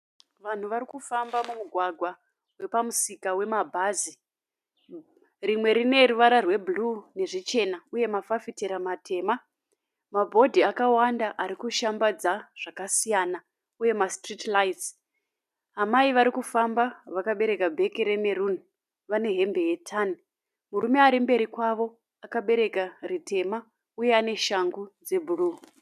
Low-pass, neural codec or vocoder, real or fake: 14.4 kHz; none; real